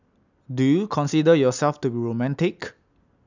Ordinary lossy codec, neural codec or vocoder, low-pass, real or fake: none; none; 7.2 kHz; real